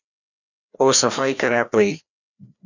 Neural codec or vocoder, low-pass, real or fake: codec, 16 kHz, 1 kbps, FreqCodec, larger model; 7.2 kHz; fake